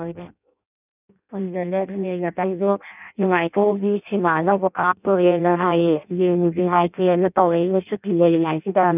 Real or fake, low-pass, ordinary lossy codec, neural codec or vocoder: fake; 3.6 kHz; none; codec, 16 kHz in and 24 kHz out, 0.6 kbps, FireRedTTS-2 codec